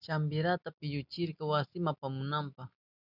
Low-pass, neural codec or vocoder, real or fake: 5.4 kHz; none; real